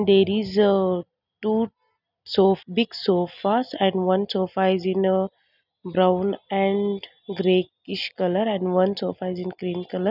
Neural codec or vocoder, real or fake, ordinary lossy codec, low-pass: none; real; none; 5.4 kHz